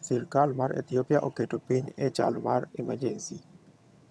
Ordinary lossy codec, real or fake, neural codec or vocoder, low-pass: none; fake; vocoder, 22.05 kHz, 80 mel bands, HiFi-GAN; none